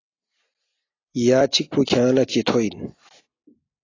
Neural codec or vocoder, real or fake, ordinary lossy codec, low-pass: none; real; AAC, 32 kbps; 7.2 kHz